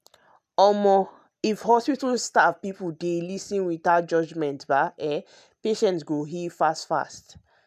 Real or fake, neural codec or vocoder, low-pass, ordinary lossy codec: real; none; 14.4 kHz; none